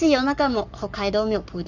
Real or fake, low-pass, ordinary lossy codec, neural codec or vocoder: fake; 7.2 kHz; none; codec, 44.1 kHz, 7.8 kbps, Pupu-Codec